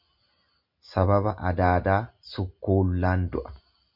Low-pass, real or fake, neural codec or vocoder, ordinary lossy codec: 5.4 kHz; real; none; MP3, 48 kbps